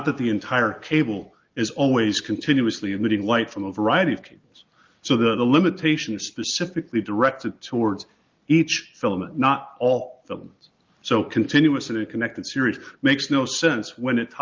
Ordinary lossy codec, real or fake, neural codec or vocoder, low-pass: Opus, 32 kbps; real; none; 7.2 kHz